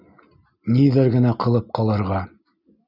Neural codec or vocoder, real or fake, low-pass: none; real; 5.4 kHz